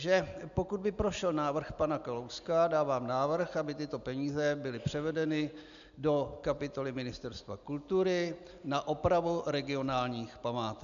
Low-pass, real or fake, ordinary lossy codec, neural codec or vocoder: 7.2 kHz; real; MP3, 96 kbps; none